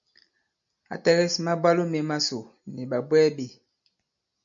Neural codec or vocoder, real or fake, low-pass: none; real; 7.2 kHz